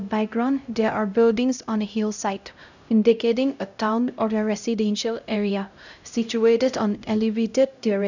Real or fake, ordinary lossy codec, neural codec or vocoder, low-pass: fake; none; codec, 16 kHz, 0.5 kbps, X-Codec, HuBERT features, trained on LibriSpeech; 7.2 kHz